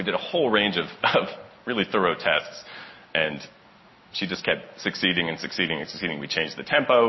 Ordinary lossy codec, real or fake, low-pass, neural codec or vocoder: MP3, 24 kbps; real; 7.2 kHz; none